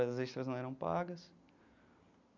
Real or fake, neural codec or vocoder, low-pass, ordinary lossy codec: real; none; 7.2 kHz; none